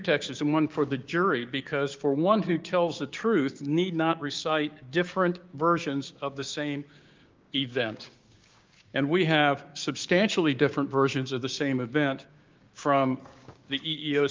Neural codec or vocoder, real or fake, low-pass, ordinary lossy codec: codec, 16 kHz, 6 kbps, DAC; fake; 7.2 kHz; Opus, 24 kbps